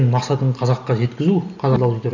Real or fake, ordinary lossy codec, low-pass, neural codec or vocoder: real; none; 7.2 kHz; none